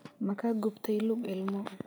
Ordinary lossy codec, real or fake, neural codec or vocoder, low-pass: none; real; none; none